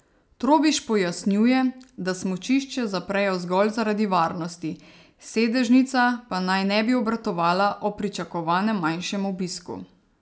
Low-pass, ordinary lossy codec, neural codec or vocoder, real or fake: none; none; none; real